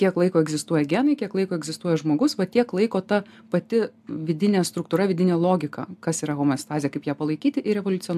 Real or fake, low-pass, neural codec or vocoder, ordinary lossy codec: real; 14.4 kHz; none; AAC, 96 kbps